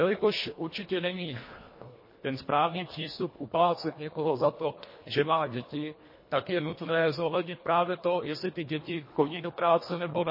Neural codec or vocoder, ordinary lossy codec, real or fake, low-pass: codec, 24 kHz, 1.5 kbps, HILCodec; MP3, 24 kbps; fake; 5.4 kHz